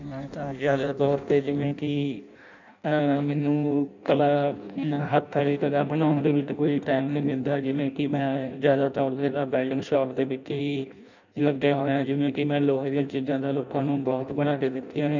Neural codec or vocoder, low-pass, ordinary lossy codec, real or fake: codec, 16 kHz in and 24 kHz out, 0.6 kbps, FireRedTTS-2 codec; 7.2 kHz; none; fake